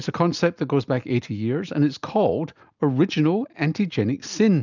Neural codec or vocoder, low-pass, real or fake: none; 7.2 kHz; real